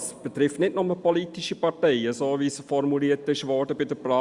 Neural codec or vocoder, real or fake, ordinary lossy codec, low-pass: none; real; Opus, 64 kbps; 10.8 kHz